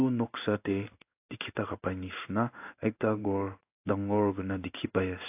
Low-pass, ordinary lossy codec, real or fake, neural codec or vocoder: 3.6 kHz; AAC, 32 kbps; fake; codec, 16 kHz in and 24 kHz out, 1 kbps, XY-Tokenizer